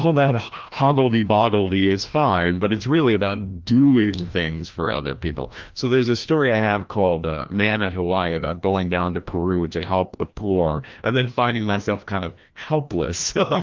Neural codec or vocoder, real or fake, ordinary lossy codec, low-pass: codec, 16 kHz, 1 kbps, FreqCodec, larger model; fake; Opus, 24 kbps; 7.2 kHz